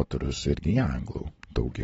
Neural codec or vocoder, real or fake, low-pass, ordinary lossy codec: none; real; 19.8 kHz; AAC, 24 kbps